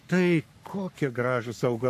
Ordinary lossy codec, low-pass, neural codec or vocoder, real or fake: MP3, 96 kbps; 14.4 kHz; codec, 44.1 kHz, 3.4 kbps, Pupu-Codec; fake